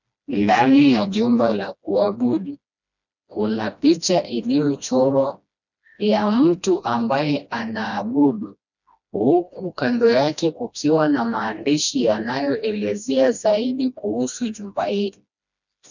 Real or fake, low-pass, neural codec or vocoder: fake; 7.2 kHz; codec, 16 kHz, 1 kbps, FreqCodec, smaller model